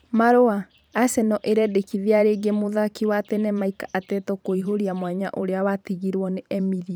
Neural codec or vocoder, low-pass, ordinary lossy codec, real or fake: vocoder, 44.1 kHz, 128 mel bands every 512 samples, BigVGAN v2; none; none; fake